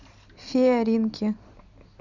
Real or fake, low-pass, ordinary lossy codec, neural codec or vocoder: real; 7.2 kHz; Opus, 64 kbps; none